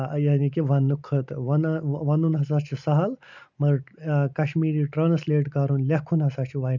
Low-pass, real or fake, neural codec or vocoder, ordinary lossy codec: 7.2 kHz; real; none; none